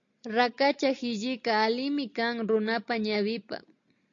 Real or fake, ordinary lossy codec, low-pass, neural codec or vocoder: real; AAC, 48 kbps; 7.2 kHz; none